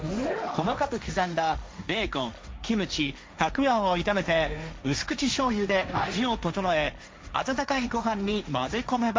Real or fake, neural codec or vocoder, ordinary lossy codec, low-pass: fake; codec, 16 kHz, 1.1 kbps, Voila-Tokenizer; none; none